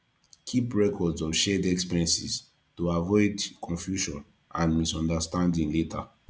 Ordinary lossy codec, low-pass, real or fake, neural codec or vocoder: none; none; real; none